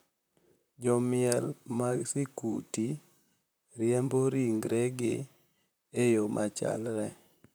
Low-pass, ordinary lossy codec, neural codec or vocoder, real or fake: none; none; vocoder, 44.1 kHz, 128 mel bands, Pupu-Vocoder; fake